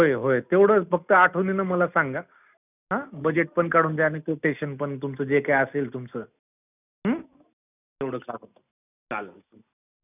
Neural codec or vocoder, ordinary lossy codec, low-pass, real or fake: none; none; 3.6 kHz; real